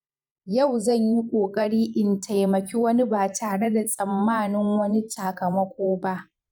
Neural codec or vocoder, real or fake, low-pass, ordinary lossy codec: vocoder, 44.1 kHz, 128 mel bands every 512 samples, BigVGAN v2; fake; 19.8 kHz; none